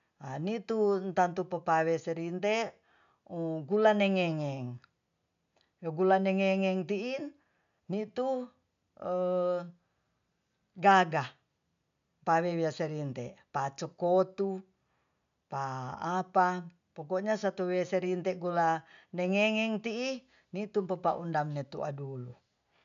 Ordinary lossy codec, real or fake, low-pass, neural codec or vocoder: none; real; 7.2 kHz; none